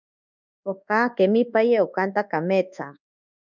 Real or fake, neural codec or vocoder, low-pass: fake; codec, 24 kHz, 1.2 kbps, DualCodec; 7.2 kHz